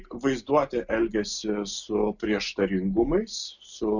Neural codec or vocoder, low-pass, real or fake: none; 7.2 kHz; real